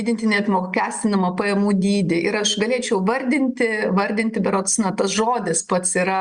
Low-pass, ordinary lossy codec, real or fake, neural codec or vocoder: 9.9 kHz; Opus, 64 kbps; real; none